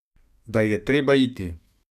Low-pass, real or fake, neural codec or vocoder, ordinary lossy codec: 14.4 kHz; fake; codec, 32 kHz, 1.9 kbps, SNAC; none